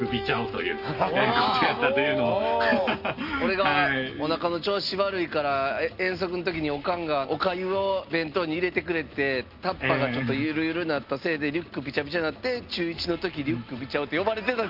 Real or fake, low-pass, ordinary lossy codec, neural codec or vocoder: real; 5.4 kHz; Opus, 32 kbps; none